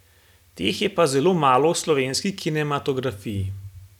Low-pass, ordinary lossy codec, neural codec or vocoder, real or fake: 19.8 kHz; none; none; real